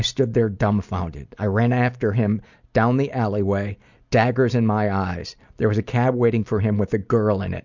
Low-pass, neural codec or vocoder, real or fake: 7.2 kHz; none; real